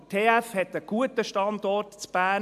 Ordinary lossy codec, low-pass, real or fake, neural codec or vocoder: AAC, 96 kbps; 14.4 kHz; real; none